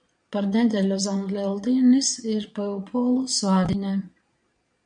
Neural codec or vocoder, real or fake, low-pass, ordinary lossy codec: vocoder, 22.05 kHz, 80 mel bands, Vocos; fake; 9.9 kHz; MP3, 96 kbps